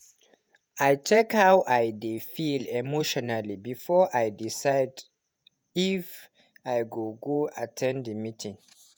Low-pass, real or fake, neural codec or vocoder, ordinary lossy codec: none; fake; vocoder, 48 kHz, 128 mel bands, Vocos; none